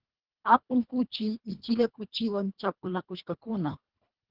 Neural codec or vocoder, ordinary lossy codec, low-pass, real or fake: codec, 24 kHz, 1.5 kbps, HILCodec; Opus, 16 kbps; 5.4 kHz; fake